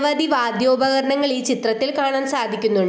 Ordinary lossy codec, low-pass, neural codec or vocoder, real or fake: none; none; none; real